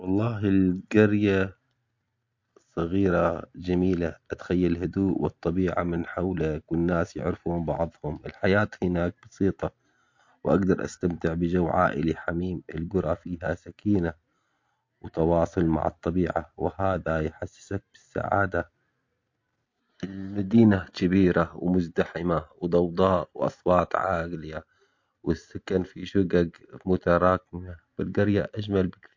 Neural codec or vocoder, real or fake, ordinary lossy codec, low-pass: none; real; MP3, 48 kbps; 7.2 kHz